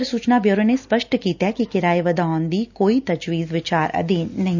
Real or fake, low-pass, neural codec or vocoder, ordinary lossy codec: real; 7.2 kHz; none; none